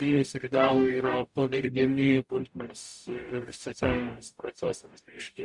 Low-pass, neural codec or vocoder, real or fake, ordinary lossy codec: 10.8 kHz; codec, 44.1 kHz, 0.9 kbps, DAC; fake; Opus, 64 kbps